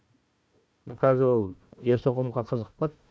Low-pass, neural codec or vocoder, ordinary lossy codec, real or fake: none; codec, 16 kHz, 1 kbps, FunCodec, trained on Chinese and English, 50 frames a second; none; fake